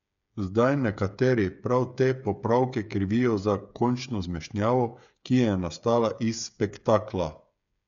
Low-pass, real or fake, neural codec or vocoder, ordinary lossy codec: 7.2 kHz; fake; codec, 16 kHz, 8 kbps, FreqCodec, smaller model; MP3, 96 kbps